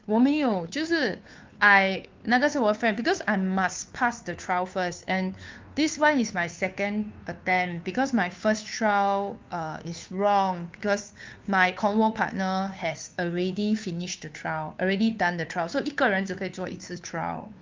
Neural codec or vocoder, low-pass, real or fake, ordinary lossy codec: codec, 16 kHz, 2 kbps, FunCodec, trained on Chinese and English, 25 frames a second; 7.2 kHz; fake; Opus, 24 kbps